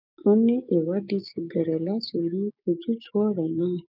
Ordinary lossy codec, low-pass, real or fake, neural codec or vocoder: none; 5.4 kHz; fake; codec, 16 kHz, 6 kbps, DAC